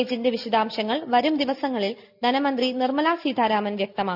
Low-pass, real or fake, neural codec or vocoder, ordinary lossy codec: 5.4 kHz; real; none; AAC, 48 kbps